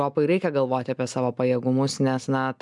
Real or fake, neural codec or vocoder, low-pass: real; none; 10.8 kHz